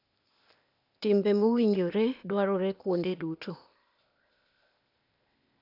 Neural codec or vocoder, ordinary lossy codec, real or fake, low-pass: codec, 16 kHz, 0.8 kbps, ZipCodec; none; fake; 5.4 kHz